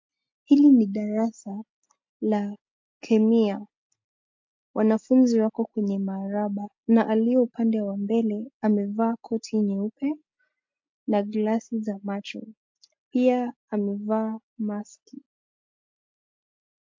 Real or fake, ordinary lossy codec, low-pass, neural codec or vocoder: real; MP3, 48 kbps; 7.2 kHz; none